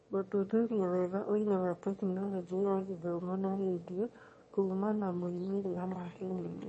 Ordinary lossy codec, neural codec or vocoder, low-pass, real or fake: MP3, 32 kbps; autoencoder, 22.05 kHz, a latent of 192 numbers a frame, VITS, trained on one speaker; 9.9 kHz; fake